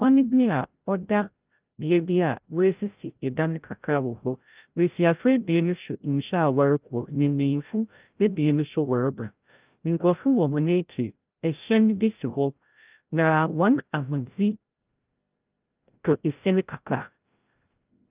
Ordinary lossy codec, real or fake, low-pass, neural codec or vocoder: Opus, 24 kbps; fake; 3.6 kHz; codec, 16 kHz, 0.5 kbps, FreqCodec, larger model